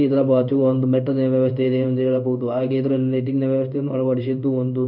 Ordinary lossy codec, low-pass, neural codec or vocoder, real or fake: none; 5.4 kHz; codec, 16 kHz in and 24 kHz out, 1 kbps, XY-Tokenizer; fake